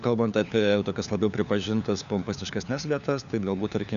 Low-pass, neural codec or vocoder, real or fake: 7.2 kHz; codec, 16 kHz, 4 kbps, FunCodec, trained on LibriTTS, 50 frames a second; fake